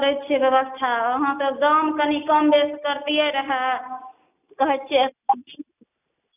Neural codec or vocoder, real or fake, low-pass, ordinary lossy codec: none; real; 3.6 kHz; none